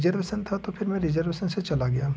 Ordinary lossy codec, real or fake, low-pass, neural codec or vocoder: none; real; none; none